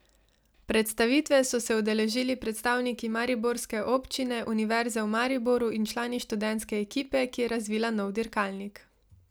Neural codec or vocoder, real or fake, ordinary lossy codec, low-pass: none; real; none; none